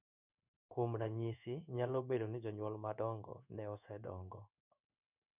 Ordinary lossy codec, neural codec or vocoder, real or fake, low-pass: none; codec, 16 kHz in and 24 kHz out, 1 kbps, XY-Tokenizer; fake; 3.6 kHz